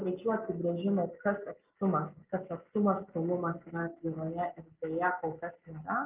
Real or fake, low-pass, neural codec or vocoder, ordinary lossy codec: real; 3.6 kHz; none; Opus, 24 kbps